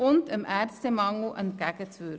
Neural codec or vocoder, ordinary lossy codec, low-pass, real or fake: none; none; none; real